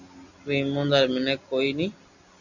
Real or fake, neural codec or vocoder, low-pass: real; none; 7.2 kHz